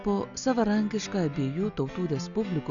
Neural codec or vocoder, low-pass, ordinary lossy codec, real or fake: none; 7.2 kHz; MP3, 96 kbps; real